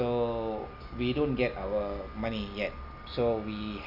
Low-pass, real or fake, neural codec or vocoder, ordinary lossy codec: 5.4 kHz; real; none; none